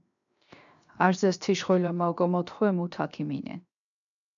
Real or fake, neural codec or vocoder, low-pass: fake; codec, 16 kHz, 0.7 kbps, FocalCodec; 7.2 kHz